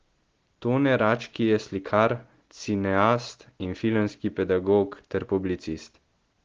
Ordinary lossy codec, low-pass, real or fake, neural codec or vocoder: Opus, 16 kbps; 7.2 kHz; real; none